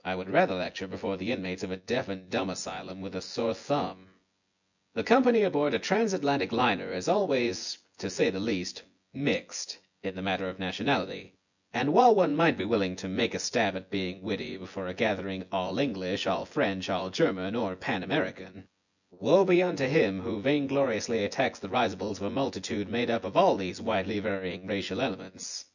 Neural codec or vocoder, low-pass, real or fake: vocoder, 24 kHz, 100 mel bands, Vocos; 7.2 kHz; fake